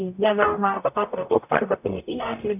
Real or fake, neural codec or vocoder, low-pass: fake; codec, 44.1 kHz, 0.9 kbps, DAC; 3.6 kHz